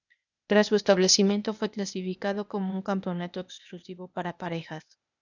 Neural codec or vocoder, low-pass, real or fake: codec, 16 kHz, 0.8 kbps, ZipCodec; 7.2 kHz; fake